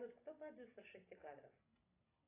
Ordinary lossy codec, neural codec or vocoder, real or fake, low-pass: AAC, 16 kbps; none; real; 3.6 kHz